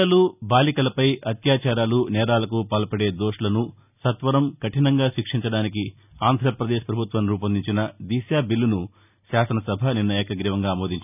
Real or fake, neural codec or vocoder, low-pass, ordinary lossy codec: real; none; 3.6 kHz; none